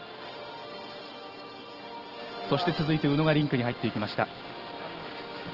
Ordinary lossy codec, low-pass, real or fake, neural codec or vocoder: Opus, 16 kbps; 5.4 kHz; real; none